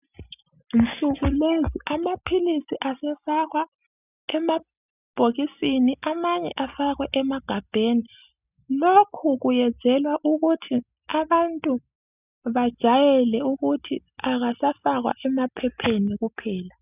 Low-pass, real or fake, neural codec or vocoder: 3.6 kHz; real; none